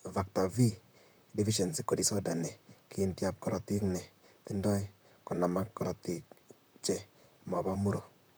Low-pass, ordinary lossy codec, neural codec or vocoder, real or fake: none; none; vocoder, 44.1 kHz, 128 mel bands, Pupu-Vocoder; fake